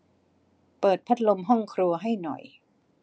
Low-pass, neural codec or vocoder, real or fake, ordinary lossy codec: none; none; real; none